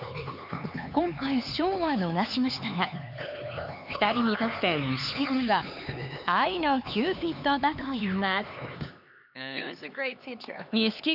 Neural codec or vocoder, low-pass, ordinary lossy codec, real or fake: codec, 16 kHz, 4 kbps, X-Codec, HuBERT features, trained on LibriSpeech; 5.4 kHz; none; fake